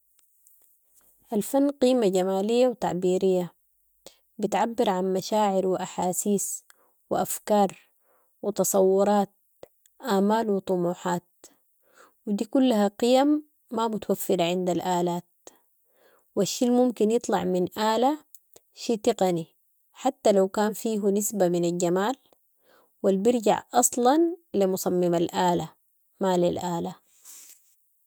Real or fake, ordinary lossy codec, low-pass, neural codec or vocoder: real; none; none; none